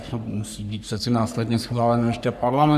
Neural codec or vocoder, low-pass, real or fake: codec, 44.1 kHz, 3.4 kbps, Pupu-Codec; 14.4 kHz; fake